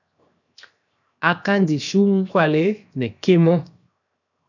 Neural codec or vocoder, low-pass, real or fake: codec, 16 kHz, 0.7 kbps, FocalCodec; 7.2 kHz; fake